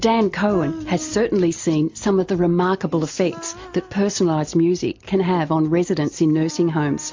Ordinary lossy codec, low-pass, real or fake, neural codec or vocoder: MP3, 48 kbps; 7.2 kHz; real; none